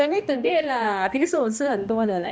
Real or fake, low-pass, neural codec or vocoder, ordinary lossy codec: fake; none; codec, 16 kHz, 1 kbps, X-Codec, HuBERT features, trained on balanced general audio; none